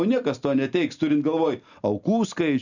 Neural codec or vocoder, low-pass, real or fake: none; 7.2 kHz; real